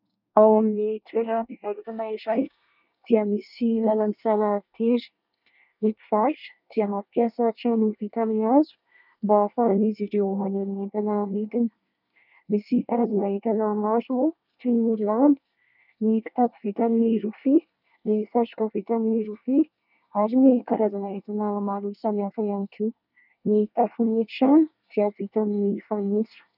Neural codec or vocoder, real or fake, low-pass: codec, 24 kHz, 1 kbps, SNAC; fake; 5.4 kHz